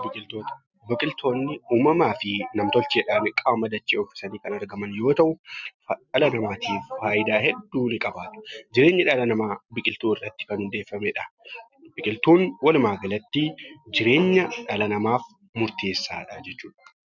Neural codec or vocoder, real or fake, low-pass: none; real; 7.2 kHz